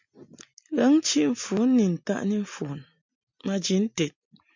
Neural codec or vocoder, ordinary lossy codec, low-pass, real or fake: none; MP3, 64 kbps; 7.2 kHz; real